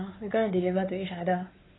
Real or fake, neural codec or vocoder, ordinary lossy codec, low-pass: fake; codec, 16 kHz, 4 kbps, X-Codec, WavLM features, trained on Multilingual LibriSpeech; AAC, 16 kbps; 7.2 kHz